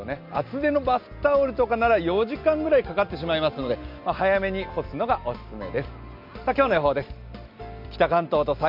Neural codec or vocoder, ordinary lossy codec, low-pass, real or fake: none; MP3, 48 kbps; 5.4 kHz; real